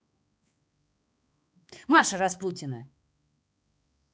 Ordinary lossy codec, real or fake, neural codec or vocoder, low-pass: none; fake; codec, 16 kHz, 2 kbps, X-Codec, HuBERT features, trained on balanced general audio; none